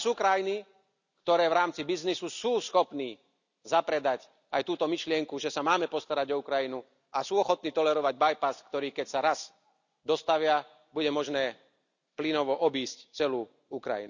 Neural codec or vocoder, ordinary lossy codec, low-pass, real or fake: none; none; 7.2 kHz; real